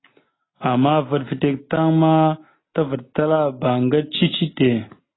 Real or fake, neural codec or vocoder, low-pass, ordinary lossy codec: real; none; 7.2 kHz; AAC, 16 kbps